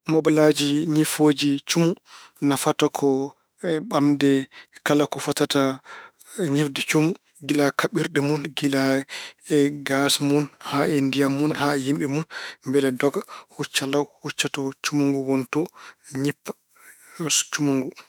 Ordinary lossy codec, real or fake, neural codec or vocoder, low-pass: none; fake; autoencoder, 48 kHz, 32 numbers a frame, DAC-VAE, trained on Japanese speech; none